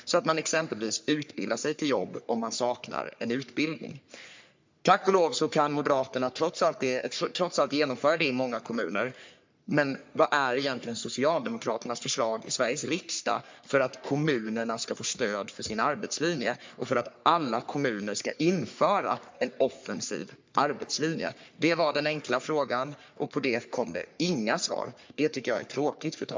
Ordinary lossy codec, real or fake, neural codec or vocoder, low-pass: MP3, 64 kbps; fake; codec, 44.1 kHz, 3.4 kbps, Pupu-Codec; 7.2 kHz